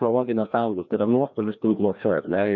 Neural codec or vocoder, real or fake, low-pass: codec, 16 kHz, 1 kbps, FreqCodec, larger model; fake; 7.2 kHz